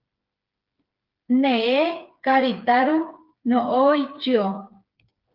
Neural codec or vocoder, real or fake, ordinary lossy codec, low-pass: codec, 16 kHz, 4 kbps, FreqCodec, smaller model; fake; Opus, 32 kbps; 5.4 kHz